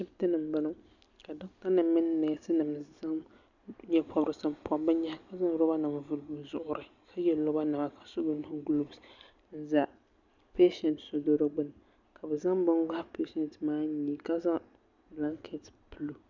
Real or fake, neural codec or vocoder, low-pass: real; none; 7.2 kHz